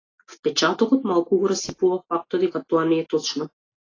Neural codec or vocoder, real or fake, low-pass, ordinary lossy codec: none; real; 7.2 kHz; AAC, 32 kbps